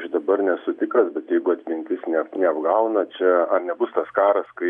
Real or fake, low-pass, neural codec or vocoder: real; 10.8 kHz; none